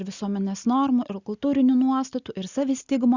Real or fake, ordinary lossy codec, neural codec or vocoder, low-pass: real; Opus, 64 kbps; none; 7.2 kHz